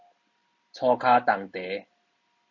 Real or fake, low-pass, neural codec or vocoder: real; 7.2 kHz; none